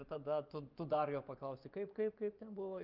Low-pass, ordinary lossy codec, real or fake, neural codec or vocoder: 5.4 kHz; Opus, 24 kbps; fake; vocoder, 24 kHz, 100 mel bands, Vocos